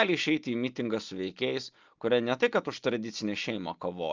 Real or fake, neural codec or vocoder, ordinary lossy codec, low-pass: real; none; Opus, 24 kbps; 7.2 kHz